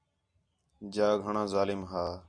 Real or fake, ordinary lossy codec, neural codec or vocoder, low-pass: real; Opus, 64 kbps; none; 9.9 kHz